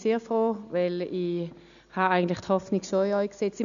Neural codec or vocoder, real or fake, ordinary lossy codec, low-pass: none; real; none; 7.2 kHz